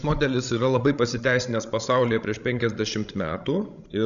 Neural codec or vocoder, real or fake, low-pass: codec, 16 kHz, 16 kbps, FreqCodec, larger model; fake; 7.2 kHz